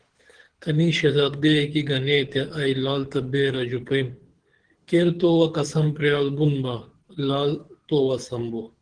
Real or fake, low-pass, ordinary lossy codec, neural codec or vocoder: fake; 9.9 kHz; Opus, 16 kbps; codec, 24 kHz, 6 kbps, HILCodec